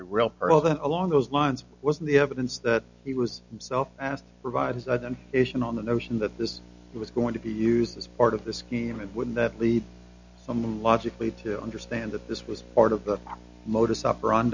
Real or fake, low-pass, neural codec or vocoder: real; 7.2 kHz; none